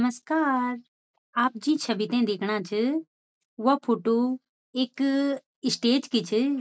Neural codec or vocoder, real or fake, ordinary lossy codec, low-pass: none; real; none; none